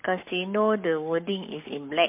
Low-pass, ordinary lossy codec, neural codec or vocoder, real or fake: 3.6 kHz; MP3, 32 kbps; codec, 44.1 kHz, 7.8 kbps, Pupu-Codec; fake